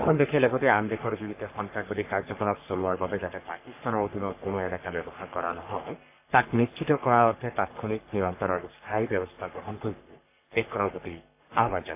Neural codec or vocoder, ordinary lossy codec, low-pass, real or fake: codec, 44.1 kHz, 3.4 kbps, Pupu-Codec; AAC, 24 kbps; 3.6 kHz; fake